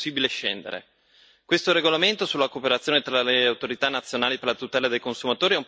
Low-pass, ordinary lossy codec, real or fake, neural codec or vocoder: none; none; real; none